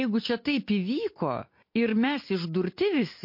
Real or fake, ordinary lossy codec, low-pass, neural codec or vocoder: real; MP3, 32 kbps; 5.4 kHz; none